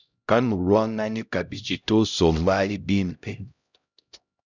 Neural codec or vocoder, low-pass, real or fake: codec, 16 kHz, 0.5 kbps, X-Codec, HuBERT features, trained on LibriSpeech; 7.2 kHz; fake